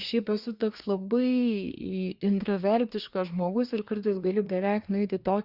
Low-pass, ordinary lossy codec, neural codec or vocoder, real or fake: 5.4 kHz; Opus, 64 kbps; codec, 24 kHz, 1 kbps, SNAC; fake